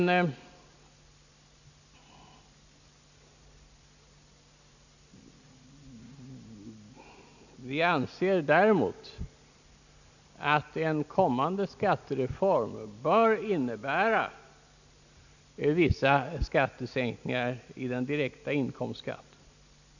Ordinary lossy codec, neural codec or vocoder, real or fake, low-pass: none; none; real; 7.2 kHz